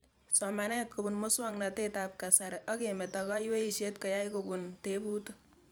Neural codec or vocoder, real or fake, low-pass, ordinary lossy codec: vocoder, 44.1 kHz, 128 mel bands every 512 samples, BigVGAN v2; fake; none; none